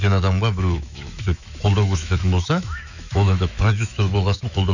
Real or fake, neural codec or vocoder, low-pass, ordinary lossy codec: fake; autoencoder, 48 kHz, 128 numbers a frame, DAC-VAE, trained on Japanese speech; 7.2 kHz; none